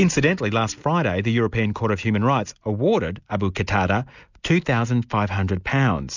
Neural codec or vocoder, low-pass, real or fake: none; 7.2 kHz; real